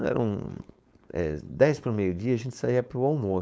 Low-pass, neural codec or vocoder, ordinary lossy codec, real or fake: none; codec, 16 kHz, 4.8 kbps, FACodec; none; fake